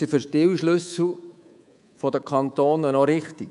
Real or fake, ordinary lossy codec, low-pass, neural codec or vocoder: fake; none; 10.8 kHz; codec, 24 kHz, 3.1 kbps, DualCodec